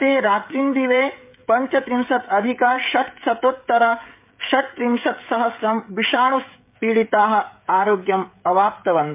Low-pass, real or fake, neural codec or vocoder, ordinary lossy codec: 3.6 kHz; fake; codec, 16 kHz, 16 kbps, FreqCodec, smaller model; MP3, 32 kbps